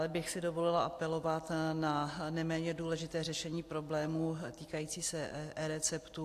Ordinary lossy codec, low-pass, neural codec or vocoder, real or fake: AAC, 64 kbps; 14.4 kHz; none; real